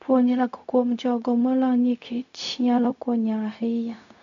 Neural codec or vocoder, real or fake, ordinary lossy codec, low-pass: codec, 16 kHz, 0.4 kbps, LongCat-Audio-Codec; fake; none; 7.2 kHz